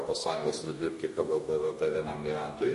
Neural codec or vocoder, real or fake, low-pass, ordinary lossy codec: codec, 44.1 kHz, 2.6 kbps, DAC; fake; 14.4 kHz; MP3, 48 kbps